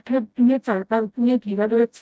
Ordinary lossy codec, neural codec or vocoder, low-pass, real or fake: none; codec, 16 kHz, 0.5 kbps, FreqCodec, smaller model; none; fake